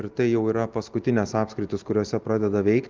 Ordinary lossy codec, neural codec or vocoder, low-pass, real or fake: Opus, 32 kbps; none; 7.2 kHz; real